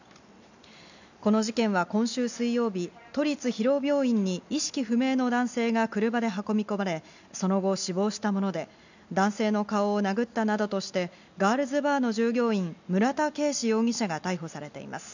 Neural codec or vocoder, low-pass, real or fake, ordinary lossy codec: none; 7.2 kHz; real; none